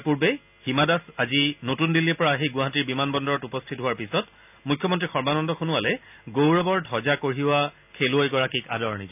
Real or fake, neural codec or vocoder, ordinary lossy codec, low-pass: real; none; none; 3.6 kHz